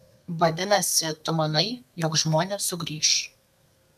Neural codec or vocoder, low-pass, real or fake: codec, 32 kHz, 1.9 kbps, SNAC; 14.4 kHz; fake